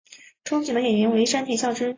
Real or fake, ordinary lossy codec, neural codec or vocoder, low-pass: real; AAC, 32 kbps; none; 7.2 kHz